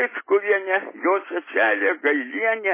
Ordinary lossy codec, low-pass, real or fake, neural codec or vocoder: MP3, 16 kbps; 3.6 kHz; real; none